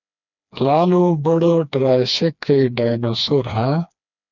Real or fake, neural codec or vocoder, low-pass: fake; codec, 16 kHz, 2 kbps, FreqCodec, smaller model; 7.2 kHz